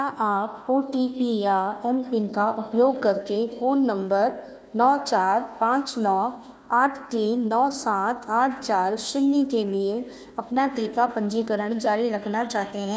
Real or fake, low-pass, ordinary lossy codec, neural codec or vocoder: fake; none; none; codec, 16 kHz, 1 kbps, FunCodec, trained on Chinese and English, 50 frames a second